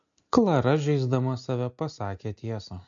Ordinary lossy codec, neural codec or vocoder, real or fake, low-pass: MP3, 48 kbps; none; real; 7.2 kHz